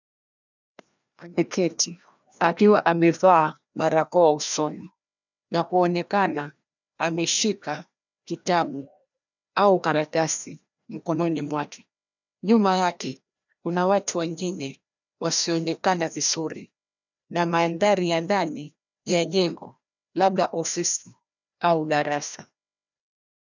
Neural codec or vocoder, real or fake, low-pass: codec, 16 kHz, 1 kbps, FreqCodec, larger model; fake; 7.2 kHz